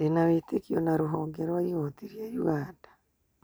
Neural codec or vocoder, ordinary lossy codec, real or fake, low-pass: none; none; real; none